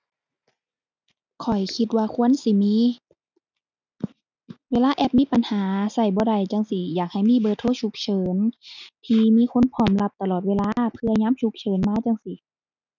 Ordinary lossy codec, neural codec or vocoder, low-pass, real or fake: none; none; 7.2 kHz; real